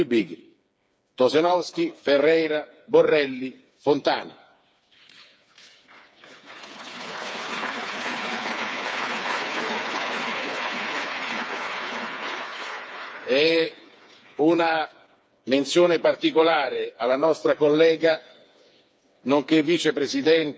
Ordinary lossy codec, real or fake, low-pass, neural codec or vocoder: none; fake; none; codec, 16 kHz, 4 kbps, FreqCodec, smaller model